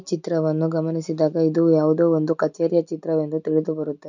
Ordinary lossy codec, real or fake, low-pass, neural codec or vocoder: none; real; 7.2 kHz; none